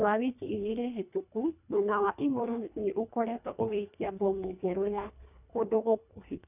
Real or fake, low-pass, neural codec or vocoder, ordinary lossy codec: fake; 3.6 kHz; codec, 24 kHz, 1.5 kbps, HILCodec; none